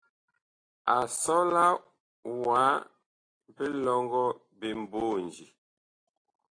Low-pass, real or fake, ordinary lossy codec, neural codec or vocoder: 9.9 kHz; real; AAC, 32 kbps; none